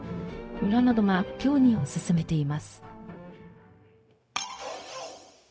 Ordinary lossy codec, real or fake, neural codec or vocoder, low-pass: none; fake; codec, 16 kHz, 0.4 kbps, LongCat-Audio-Codec; none